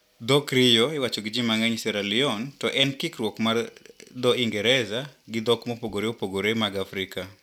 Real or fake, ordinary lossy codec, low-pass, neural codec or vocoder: real; none; 19.8 kHz; none